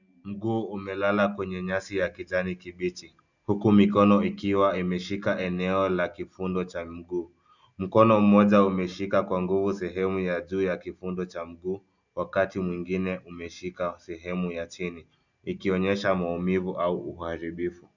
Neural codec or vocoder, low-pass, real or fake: none; 7.2 kHz; real